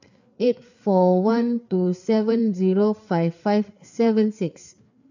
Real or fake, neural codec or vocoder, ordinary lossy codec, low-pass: fake; codec, 16 kHz, 4 kbps, FreqCodec, larger model; none; 7.2 kHz